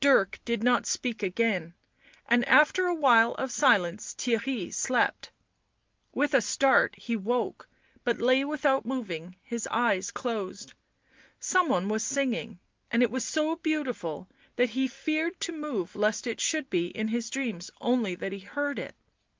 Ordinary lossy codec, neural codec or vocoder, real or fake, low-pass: Opus, 32 kbps; none; real; 7.2 kHz